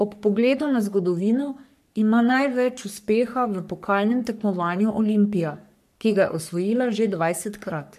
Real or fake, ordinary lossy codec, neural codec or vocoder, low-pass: fake; MP3, 96 kbps; codec, 44.1 kHz, 3.4 kbps, Pupu-Codec; 14.4 kHz